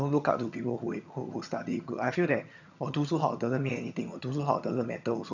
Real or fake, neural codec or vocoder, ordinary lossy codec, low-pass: fake; vocoder, 22.05 kHz, 80 mel bands, HiFi-GAN; none; 7.2 kHz